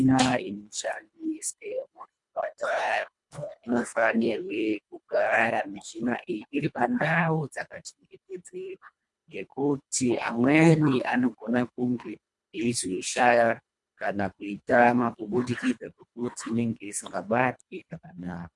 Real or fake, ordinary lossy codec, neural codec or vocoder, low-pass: fake; MP3, 64 kbps; codec, 24 kHz, 1.5 kbps, HILCodec; 10.8 kHz